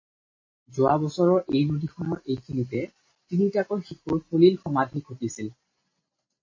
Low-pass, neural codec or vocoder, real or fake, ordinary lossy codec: 7.2 kHz; none; real; MP3, 32 kbps